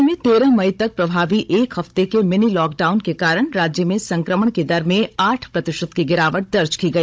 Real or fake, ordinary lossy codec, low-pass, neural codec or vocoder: fake; none; none; codec, 16 kHz, 16 kbps, FunCodec, trained on Chinese and English, 50 frames a second